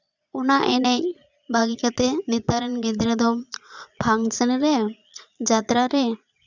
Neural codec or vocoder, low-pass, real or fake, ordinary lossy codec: vocoder, 22.05 kHz, 80 mel bands, Vocos; 7.2 kHz; fake; none